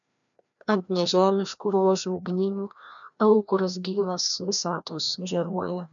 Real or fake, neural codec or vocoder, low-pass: fake; codec, 16 kHz, 1 kbps, FreqCodec, larger model; 7.2 kHz